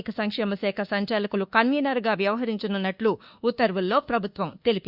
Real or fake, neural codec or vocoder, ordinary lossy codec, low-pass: fake; codec, 24 kHz, 1.2 kbps, DualCodec; Opus, 64 kbps; 5.4 kHz